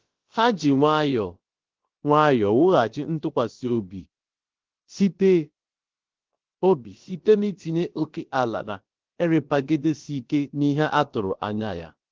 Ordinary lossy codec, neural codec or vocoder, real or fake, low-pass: Opus, 16 kbps; codec, 16 kHz, about 1 kbps, DyCAST, with the encoder's durations; fake; 7.2 kHz